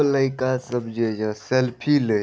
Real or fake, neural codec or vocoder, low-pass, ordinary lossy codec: real; none; none; none